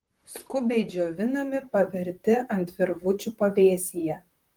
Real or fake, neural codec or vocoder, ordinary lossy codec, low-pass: fake; vocoder, 44.1 kHz, 128 mel bands, Pupu-Vocoder; Opus, 24 kbps; 19.8 kHz